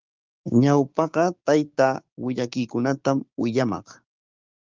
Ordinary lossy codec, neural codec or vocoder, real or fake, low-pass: Opus, 24 kbps; codec, 16 kHz, 6 kbps, DAC; fake; 7.2 kHz